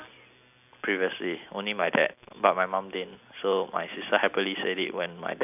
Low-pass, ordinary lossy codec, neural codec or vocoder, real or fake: 3.6 kHz; none; none; real